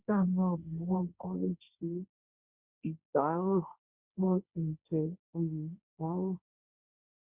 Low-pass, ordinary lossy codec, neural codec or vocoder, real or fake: 3.6 kHz; Opus, 64 kbps; codec, 16 kHz, 1.1 kbps, Voila-Tokenizer; fake